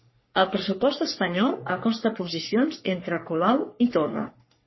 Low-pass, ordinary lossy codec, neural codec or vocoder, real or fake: 7.2 kHz; MP3, 24 kbps; codec, 44.1 kHz, 3.4 kbps, Pupu-Codec; fake